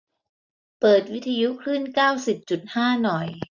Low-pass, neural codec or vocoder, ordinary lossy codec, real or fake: 7.2 kHz; none; none; real